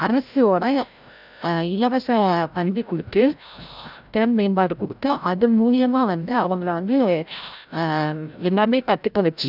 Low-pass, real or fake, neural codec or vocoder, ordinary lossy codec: 5.4 kHz; fake; codec, 16 kHz, 0.5 kbps, FreqCodec, larger model; none